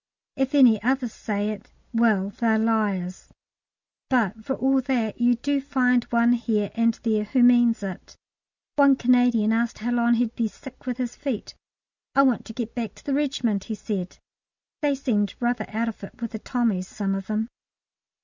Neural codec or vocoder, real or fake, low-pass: none; real; 7.2 kHz